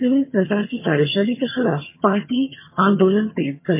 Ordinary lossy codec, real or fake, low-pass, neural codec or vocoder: MP3, 24 kbps; fake; 3.6 kHz; vocoder, 22.05 kHz, 80 mel bands, HiFi-GAN